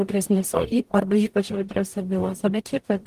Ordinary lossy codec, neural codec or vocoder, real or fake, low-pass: Opus, 24 kbps; codec, 44.1 kHz, 0.9 kbps, DAC; fake; 14.4 kHz